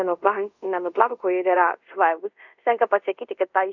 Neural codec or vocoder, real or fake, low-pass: codec, 24 kHz, 0.5 kbps, DualCodec; fake; 7.2 kHz